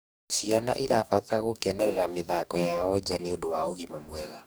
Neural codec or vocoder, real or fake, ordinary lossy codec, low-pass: codec, 44.1 kHz, 2.6 kbps, DAC; fake; none; none